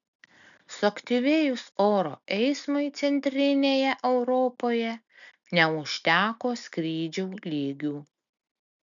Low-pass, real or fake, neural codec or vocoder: 7.2 kHz; real; none